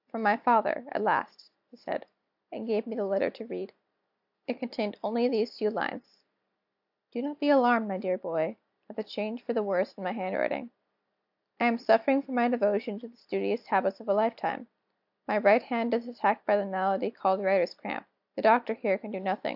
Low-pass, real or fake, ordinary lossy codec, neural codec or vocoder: 5.4 kHz; real; AAC, 48 kbps; none